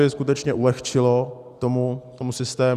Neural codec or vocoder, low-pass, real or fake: none; 14.4 kHz; real